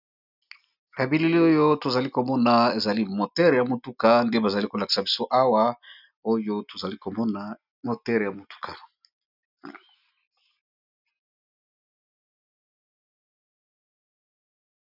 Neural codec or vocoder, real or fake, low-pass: none; real; 5.4 kHz